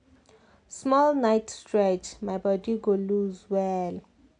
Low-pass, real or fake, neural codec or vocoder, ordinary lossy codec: 9.9 kHz; real; none; none